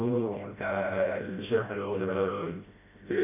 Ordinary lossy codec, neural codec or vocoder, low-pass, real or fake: none; codec, 16 kHz, 0.5 kbps, FreqCodec, smaller model; 3.6 kHz; fake